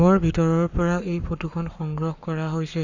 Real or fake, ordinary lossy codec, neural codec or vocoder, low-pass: fake; none; codec, 44.1 kHz, 7.8 kbps, Pupu-Codec; 7.2 kHz